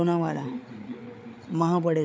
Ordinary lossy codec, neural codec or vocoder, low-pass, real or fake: none; codec, 16 kHz, 8 kbps, FreqCodec, larger model; none; fake